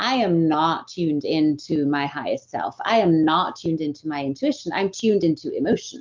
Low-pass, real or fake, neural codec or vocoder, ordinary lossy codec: 7.2 kHz; real; none; Opus, 24 kbps